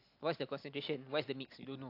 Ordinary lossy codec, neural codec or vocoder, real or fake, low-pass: AAC, 32 kbps; none; real; 5.4 kHz